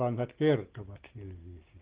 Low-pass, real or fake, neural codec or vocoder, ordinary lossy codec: 3.6 kHz; real; none; Opus, 16 kbps